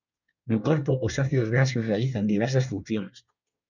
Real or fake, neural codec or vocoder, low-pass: fake; codec, 24 kHz, 1 kbps, SNAC; 7.2 kHz